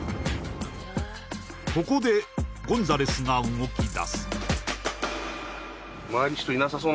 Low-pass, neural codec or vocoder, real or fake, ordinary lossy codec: none; none; real; none